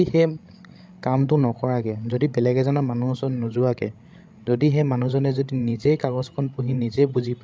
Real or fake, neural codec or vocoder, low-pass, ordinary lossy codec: fake; codec, 16 kHz, 16 kbps, FreqCodec, larger model; none; none